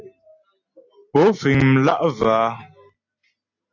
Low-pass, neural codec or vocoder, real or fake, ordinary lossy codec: 7.2 kHz; none; real; AAC, 48 kbps